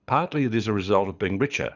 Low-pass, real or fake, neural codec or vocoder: 7.2 kHz; fake; codec, 24 kHz, 6 kbps, HILCodec